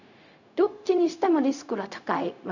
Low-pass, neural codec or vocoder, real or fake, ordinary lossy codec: 7.2 kHz; codec, 16 kHz, 0.4 kbps, LongCat-Audio-Codec; fake; none